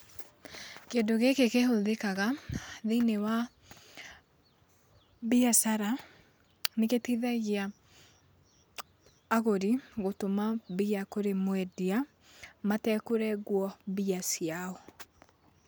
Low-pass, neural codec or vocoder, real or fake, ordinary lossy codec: none; none; real; none